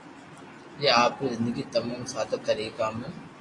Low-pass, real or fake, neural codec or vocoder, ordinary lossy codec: 10.8 kHz; real; none; AAC, 32 kbps